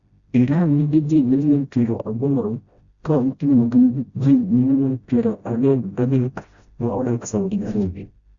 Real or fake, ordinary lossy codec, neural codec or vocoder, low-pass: fake; Opus, 64 kbps; codec, 16 kHz, 0.5 kbps, FreqCodec, smaller model; 7.2 kHz